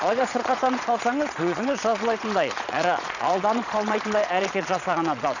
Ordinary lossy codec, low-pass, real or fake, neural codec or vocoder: none; 7.2 kHz; fake; vocoder, 22.05 kHz, 80 mel bands, Vocos